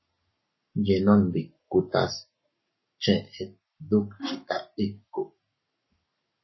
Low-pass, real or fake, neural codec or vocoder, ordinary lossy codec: 7.2 kHz; fake; codec, 44.1 kHz, 7.8 kbps, Pupu-Codec; MP3, 24 kbps